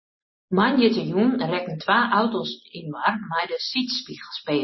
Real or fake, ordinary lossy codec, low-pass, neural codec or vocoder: real; MP3, 24 kbps; 7.2 kHz; none